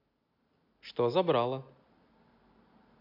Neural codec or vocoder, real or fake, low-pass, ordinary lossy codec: none; real; 5.4 kHz; AAC, 32 kbps